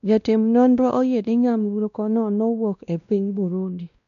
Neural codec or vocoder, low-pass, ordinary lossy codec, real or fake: codec, 16 kHz, 1 kbps, X-Codec, WavLM features, trained on Multilingual LibriSpeech; 7.2 kHz; none; fake